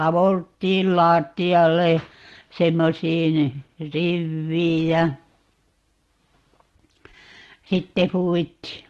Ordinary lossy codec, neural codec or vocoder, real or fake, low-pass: Opus, 16 kbps; none; real; 14.4 kHz